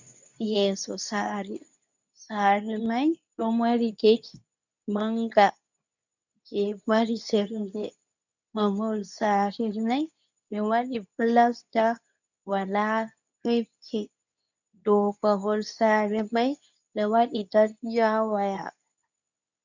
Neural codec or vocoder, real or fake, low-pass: codec, 24 kHz, 0.9 kbps, WavTokenizer, medium speech release version 2; fake; 7.2 kHz